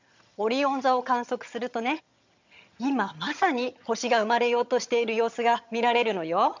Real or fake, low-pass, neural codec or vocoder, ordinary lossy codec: fake; 7.2 kHz; vocoder, 22.05 kHz, 80 mel bands, HiFi-GAN; MP3, 64 kbps